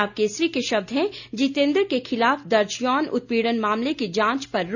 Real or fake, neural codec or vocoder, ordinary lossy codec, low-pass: real; none; none; 7.2 kHz